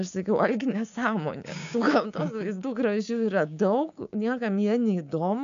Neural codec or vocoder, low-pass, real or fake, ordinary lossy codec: codec, 16 kHz, 6 kbps, DAC; 7.2 kHz; fake; MP3, 64 kbps